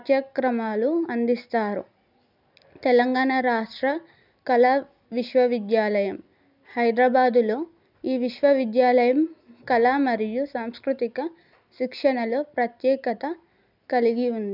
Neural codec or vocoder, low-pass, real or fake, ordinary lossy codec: none; 5.4 kHz; real; none